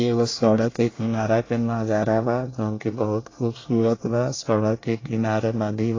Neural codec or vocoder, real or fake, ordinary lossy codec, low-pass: codec, 24 kHz, 1 kbps, SNAC; fake; AAC, 32 kbps; 7.2 kHz